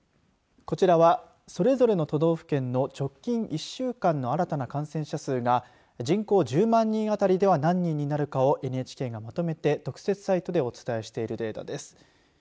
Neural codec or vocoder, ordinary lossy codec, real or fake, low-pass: none; none; real; none